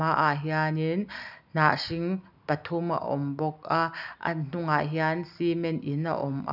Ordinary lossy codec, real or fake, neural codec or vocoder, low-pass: none; real; none; 5.4 kHz